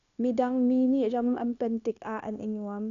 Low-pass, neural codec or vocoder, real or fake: 7.2 kHz; codec, 16 kHz, 0.9 kbps, LongCat-Audio-Codec; fake